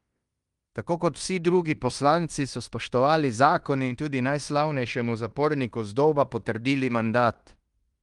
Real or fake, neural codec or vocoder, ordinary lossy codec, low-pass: fake; codec, 16 kHz in and 24 kHz out, 0.9 kbps, LongCat-Audio-Codec, fine tuned four codebook decoder; Opus, 32 kbps; 10.8 kHz